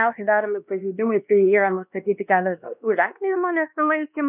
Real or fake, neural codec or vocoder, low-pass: fake; codec, 16 kHz, 1 kbps, X-Codec, WavLM features, trained on Multilingual LibriSpeech; 3.6 kHz